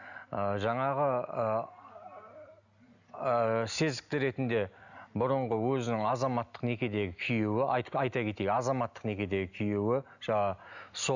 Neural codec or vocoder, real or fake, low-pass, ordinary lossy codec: none; real; 7.2 kHz; none